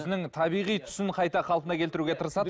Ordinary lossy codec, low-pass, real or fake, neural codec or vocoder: none; none; real; none